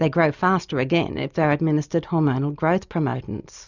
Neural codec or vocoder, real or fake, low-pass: none; real; 7.2 kHz